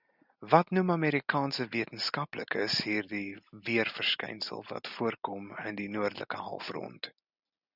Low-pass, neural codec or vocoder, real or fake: 5.4 kHz; none; real